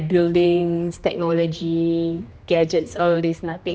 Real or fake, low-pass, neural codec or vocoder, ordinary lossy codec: fake; none; codec, 16 kHz, 2 kbps, X-Codec, HuBERT features, trained on general audio; none